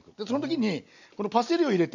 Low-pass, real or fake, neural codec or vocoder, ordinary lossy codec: 7.2 kHz; real; none; none